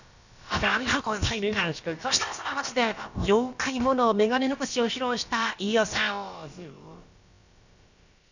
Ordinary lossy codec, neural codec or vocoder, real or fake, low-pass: none; codec, 16 kHz, about 1 kbps, DyCAST, with the encoder's durations; fake; 7.2 kHz